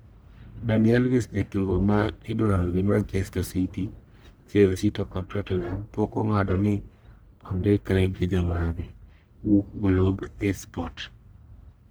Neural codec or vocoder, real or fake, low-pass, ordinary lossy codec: codec, 44.1 kHz, 1.7 kbps, Pupu-Codec; fake; none; none